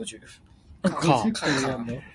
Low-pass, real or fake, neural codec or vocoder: 10.8 kHz; real; none